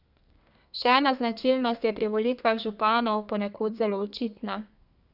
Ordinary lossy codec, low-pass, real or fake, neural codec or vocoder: none; 5.4 kHz; fake; codec, 32 kHz, 1.9 kbps, SNAC